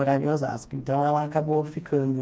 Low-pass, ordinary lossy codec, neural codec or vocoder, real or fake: none; none; codec, 16 kHz, 2 kbps, FreqCodec, smaller model; fake